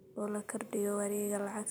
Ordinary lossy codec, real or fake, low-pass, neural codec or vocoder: none; real; none; none